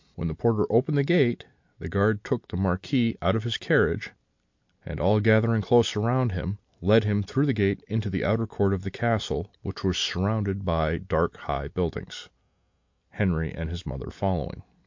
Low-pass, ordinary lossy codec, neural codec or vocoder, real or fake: 7.2 kHz; MP3, 48 kbps; none; real